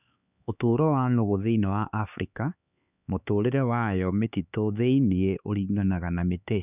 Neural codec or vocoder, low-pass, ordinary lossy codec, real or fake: codec, 16 kHz, 4 kbps, X-Codec, HuBERT features, trained on LibriSpeech; 3.6 kHz; none; fake